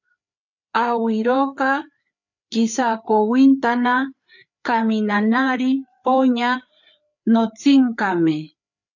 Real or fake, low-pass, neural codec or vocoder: fake; 7.2 kHz; codec, 16 kHz, 4 kbps, FreqCodec, larger model